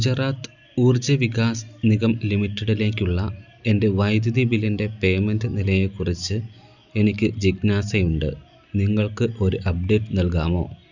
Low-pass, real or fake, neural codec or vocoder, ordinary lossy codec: 7.2 kHz; real; none; none